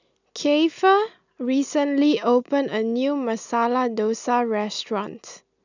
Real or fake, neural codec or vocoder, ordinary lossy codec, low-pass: real; none; none; 7.2 kHz